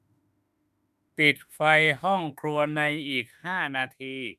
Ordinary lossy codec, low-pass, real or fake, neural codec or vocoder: none; 14.4 kHz; fake; autoencoder, 48 kHz, 32 numbers a frame, DAC-VAE, trained on Japanese speech